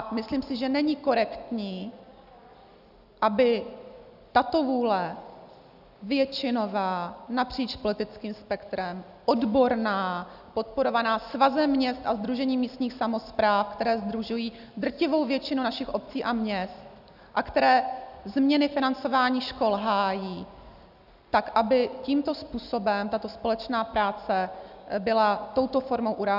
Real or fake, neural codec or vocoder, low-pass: real; none; 5.4 kHz